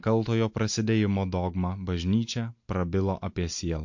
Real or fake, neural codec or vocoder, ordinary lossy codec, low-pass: real; none; MP3, 48 kbps; 7.2 kHz